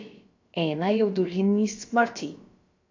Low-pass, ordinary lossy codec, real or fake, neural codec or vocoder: 7.2 kHz; AAC, 48 kbps; fake; codec, 16 kHz, about 1 kbps, DyCAST, with the encoder's durations